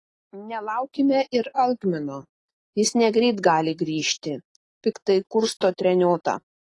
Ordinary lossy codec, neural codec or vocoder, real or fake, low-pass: AAC, 32 kbps; none; real; 10.8 kHz